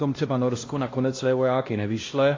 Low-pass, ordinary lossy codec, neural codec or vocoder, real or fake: 7.2 kHz; AAC, 32 kbps; codec, 16 kHz, 1 kbps, X-Codec, HuBERT features, trained on LibriSpeech; fake